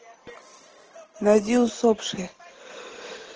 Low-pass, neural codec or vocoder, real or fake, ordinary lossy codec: 7.2 kHz; none; real; Opus, 16 kbps